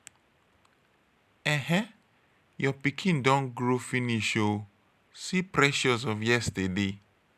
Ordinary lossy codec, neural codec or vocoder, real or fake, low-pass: none; none; real; 14.4 kHz